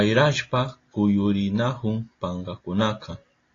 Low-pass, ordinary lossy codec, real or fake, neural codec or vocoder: 7.2 kHz; AAC, 32 kbps; real; none